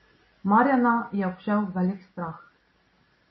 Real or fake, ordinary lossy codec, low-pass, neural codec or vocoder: real; MP3, 24 kbps; 7.2 kHz; none